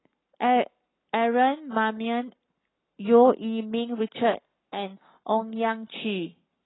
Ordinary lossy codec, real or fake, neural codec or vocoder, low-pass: AAC, 16 kbps; fake; codec, 44.1 kHz, 7.8 kbps, Pupu-Codec; 7.2 kHz